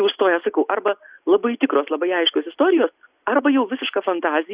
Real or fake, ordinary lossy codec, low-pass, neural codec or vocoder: real; Opus, 64 kbps; 3.6 kHz; none